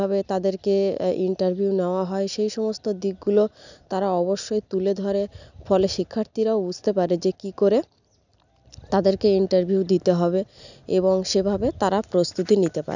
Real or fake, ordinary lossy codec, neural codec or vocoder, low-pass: real; none; none; 7.2 kHz